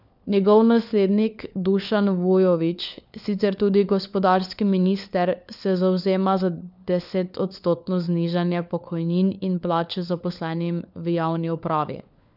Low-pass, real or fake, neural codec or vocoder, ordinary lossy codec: 5.4 kHz; fake; codec, 16 kHz, 4 kbps, FunCodec, trained on LibriTTS, 50 frames a second; none